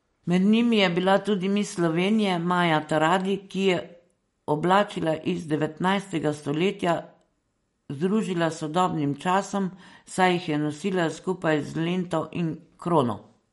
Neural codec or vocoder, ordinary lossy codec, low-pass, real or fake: none; MP3, 48 kbps; 19.8 kHz; real